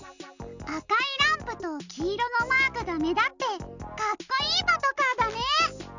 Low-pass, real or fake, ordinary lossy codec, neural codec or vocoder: 7.2 kHz; real; none; none